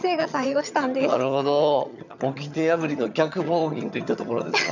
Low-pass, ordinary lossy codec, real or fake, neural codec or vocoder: 7.2 kHz; none; fake; vocoder, 22.05 kHz, 80 mel bands, HiFi-GAN